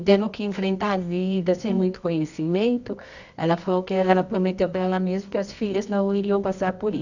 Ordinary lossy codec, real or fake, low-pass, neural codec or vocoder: none; fake; 7.2 kHz; codec, 24 kHz, 0.9 kbps, WavTokenizer, medium music audio release